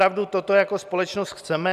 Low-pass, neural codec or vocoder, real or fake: 14.4 kHz; none; real